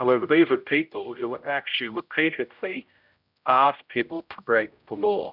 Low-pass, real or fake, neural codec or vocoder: 5.4 kHz; fake; codec, 16 kHz, 0.5 kbps, X-Codec, HuBERT features, trained on general audio